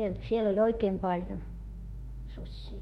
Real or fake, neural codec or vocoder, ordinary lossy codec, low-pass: fake; autoencoder, 48 kHz, 32 numbers a frame, DAC-VAE, trained on Japanese speech; MP3, 64 kbps; 19.8 kHz